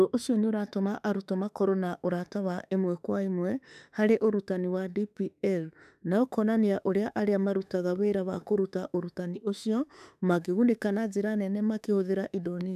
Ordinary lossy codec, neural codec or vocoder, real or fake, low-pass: none; autoencoder, 48 kHz, 32 numbers a frame, DAC-VAE, trained on Japanese speech; fake; 14.4 kHz